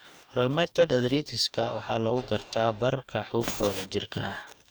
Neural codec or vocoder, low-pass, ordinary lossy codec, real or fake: codec, 44.1 kHz, 2.6 kbps, DAC; none; none; fake